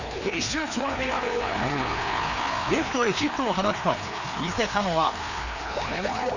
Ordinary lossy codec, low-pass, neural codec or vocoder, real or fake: none; 7.2 kHz; codec, 16 kHz, 2 kbps, FreqCodec, larger model; fake